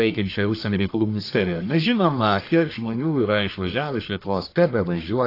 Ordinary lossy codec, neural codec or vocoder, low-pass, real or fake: AAC, 32 kbps; codec, 44.1 kHz, 1.7 kbps, Pupu-Codec; 5.4 kHz; fake